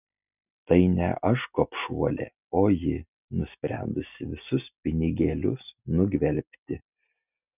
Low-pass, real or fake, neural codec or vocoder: 3.6 kHz; real; none